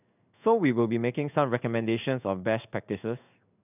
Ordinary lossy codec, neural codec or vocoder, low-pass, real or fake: none; codec, 16 kHz in and 24 kHz out, 1 kbps, XY-Tokenizer; 3.6 kHz; fake